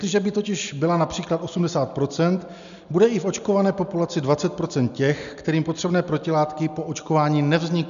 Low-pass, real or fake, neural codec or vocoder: 7.2 kHz; real; none